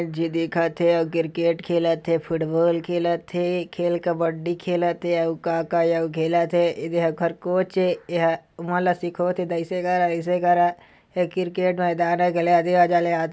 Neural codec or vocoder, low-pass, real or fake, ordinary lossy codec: none; none; real; none